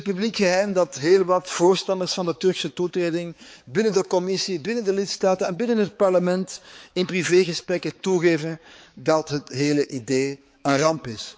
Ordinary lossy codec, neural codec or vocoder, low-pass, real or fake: none; codec, 16 kHz, 4 kbps, X-Codec, HuBERT features, trained on balanced general audio; none; fake